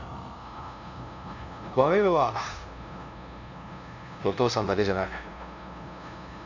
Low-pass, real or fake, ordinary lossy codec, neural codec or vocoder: 7.2 kHz; fake; none; codec, 16 kHz, 0.5 kbps, FunCodec, trained on LibriTTS, 25 frames a second